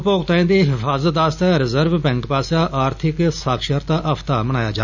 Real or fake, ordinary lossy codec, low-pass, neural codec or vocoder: real; none; 7.2 kHz; none